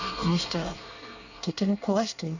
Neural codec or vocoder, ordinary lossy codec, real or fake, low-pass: codec, 24 kHz, 1 kbps, SNAC; none; fake; 7.2 kHz